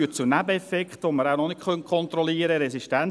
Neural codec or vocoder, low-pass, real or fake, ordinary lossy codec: none; none; real; none